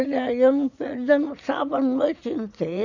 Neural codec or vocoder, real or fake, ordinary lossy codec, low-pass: vocoder, 44.1 kHz, 80 mel bands, Vocos; fake; none; 7.2 kHz